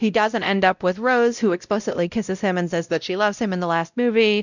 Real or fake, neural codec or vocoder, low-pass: fake; codec, 16 kHz, 0.5 kbps, X-Codec, WavLM features, trained on Multilingual LibriSpeech; 7.2 kHz